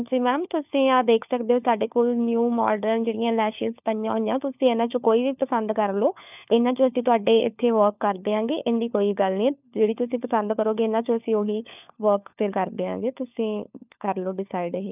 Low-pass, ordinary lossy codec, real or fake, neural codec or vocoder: 3.6 kHz; none; fake; codec, 16 kHz, 4 kbps, FunCodec, trained on LibriTTS, 50 frames a second